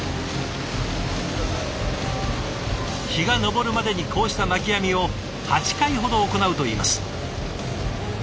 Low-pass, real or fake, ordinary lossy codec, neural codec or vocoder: none; real; none; none